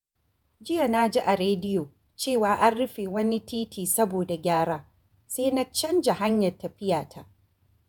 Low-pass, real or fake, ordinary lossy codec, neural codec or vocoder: none; fake; none; vocoder, 48 kHz, 128 mel bands, Vocos